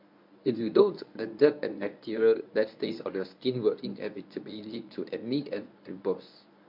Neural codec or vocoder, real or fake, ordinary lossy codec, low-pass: codec, 24 kHz, 0.9 kbps, WavTokenizer, medium speech release version 1; fake; MP3, 48 kbps; 5.4 kHz